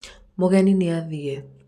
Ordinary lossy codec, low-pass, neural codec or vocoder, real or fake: none; 14.4 kHz; none; real